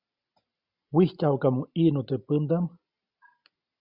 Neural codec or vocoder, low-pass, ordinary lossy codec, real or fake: none; 5.4 kHz; Opus, 64 kbps; real